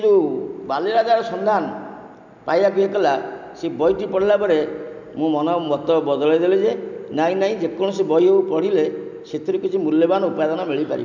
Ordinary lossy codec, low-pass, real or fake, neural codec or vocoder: none; 7.2 kHz; real; none